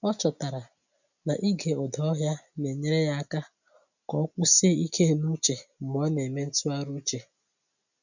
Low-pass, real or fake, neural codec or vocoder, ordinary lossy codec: 7.2 kHz; real; none; none